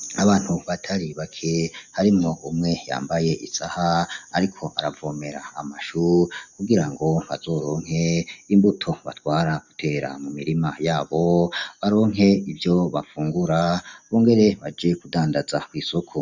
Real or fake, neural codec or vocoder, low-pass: real; none; 7.2 kHz